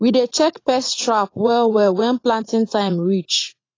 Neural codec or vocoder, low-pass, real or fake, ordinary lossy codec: vocoder, 44.1 kHz, 80 mel bands, Vocos; 7.2 kHz; fake; AAC, 32 kbps